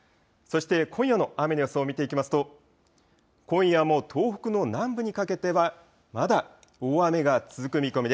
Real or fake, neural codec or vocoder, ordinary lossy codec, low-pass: real; none; none; none